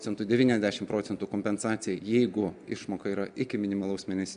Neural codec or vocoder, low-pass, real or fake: none; 9.9 kHz; real